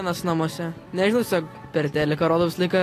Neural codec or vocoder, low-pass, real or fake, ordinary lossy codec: none; 14.4 kHz; real; AAC, 48 kbps